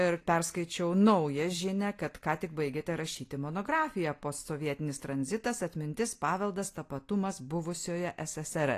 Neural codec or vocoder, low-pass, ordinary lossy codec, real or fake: none; 14.4 kHz; AAC, 48 kbps; real